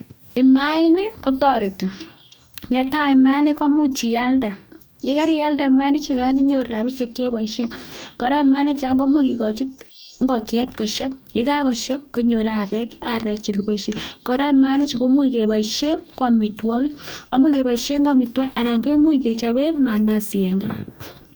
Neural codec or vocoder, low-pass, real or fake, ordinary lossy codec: codec, 44.1 kHz, 2.6 kbps, DAC; none; fake; none